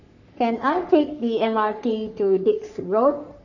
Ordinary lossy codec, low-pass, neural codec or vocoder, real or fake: none; 7.2 kHz; codec, 44.1 kHz, 3.4 kbps, Pupu-Codec; fake